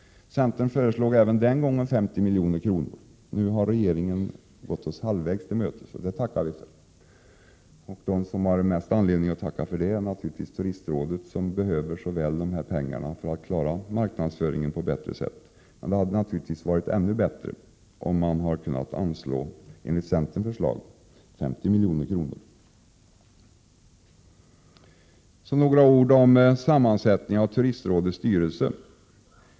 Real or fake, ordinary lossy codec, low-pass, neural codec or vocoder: real; none; none; none